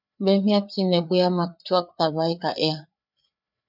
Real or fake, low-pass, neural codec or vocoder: fake; 5.4 kHz; codec, 16 kHz, 4 kbps, FreqCodec, larger model